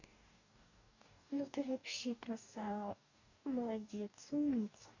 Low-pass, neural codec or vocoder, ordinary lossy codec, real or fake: 7.2 kHz; codec, 44.1 kHz, 2.6 kbps, DAC; none; fake